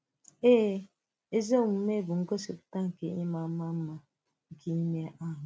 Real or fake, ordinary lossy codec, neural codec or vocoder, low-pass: real; none; none; none